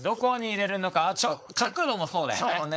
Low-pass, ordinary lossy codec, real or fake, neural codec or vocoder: none; none; fake; codec, 16 kHz, 4.8 kbps, FACodec